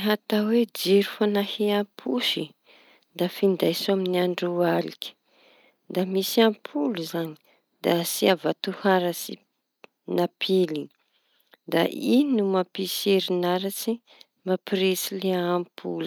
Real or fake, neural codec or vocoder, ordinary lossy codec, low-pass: real; none; none; none